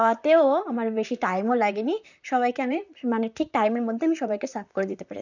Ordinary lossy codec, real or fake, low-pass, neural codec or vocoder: none; fake; 7.2 kHz; vocoder, 44.1 kHz, 128 mel bands, Pupu-Vocoder